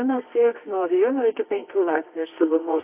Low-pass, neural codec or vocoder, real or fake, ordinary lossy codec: 3.6 kHz; codec, 24 kHz, 0.9 kbps, WavTokenizer, medium music audio release; fake; AAC, 32 kbps